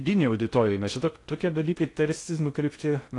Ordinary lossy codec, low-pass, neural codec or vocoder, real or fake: AAC, 48 kbps; 10.8 kHz; codec, 16 kHz in and 24 kHz out, 0.6 kbps, FocalCodec, streaming, 2048 codes; fake